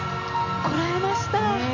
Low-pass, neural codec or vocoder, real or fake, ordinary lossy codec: 7.2 kHz; none; real; none